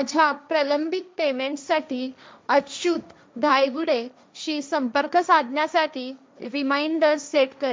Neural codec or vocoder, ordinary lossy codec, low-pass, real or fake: codec, 16 kHz, 1.1 kbps, Voila-Tokenizer; none; none; fake